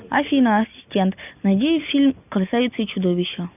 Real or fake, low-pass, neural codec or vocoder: real; 3.6 kHz; none